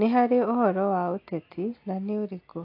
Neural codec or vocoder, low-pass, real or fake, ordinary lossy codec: none; 5.4 kHz; real; AAC, 32 kbps